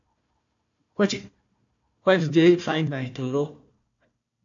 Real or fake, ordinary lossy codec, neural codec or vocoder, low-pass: fake; AAC, 48 kbps; codec, 16 kHz, 1 kbps, FunCodec, trained on Chinese and English, 50 frames a second; 7.2 kHz